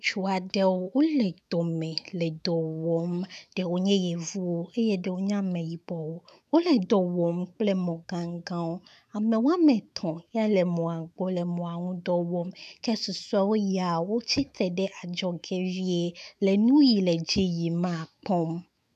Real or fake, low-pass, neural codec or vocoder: fake; 14.4 kHz; autoencoder, 48 kHz, 128 numbers a frame, DAC-VAE, trained on Japanese speech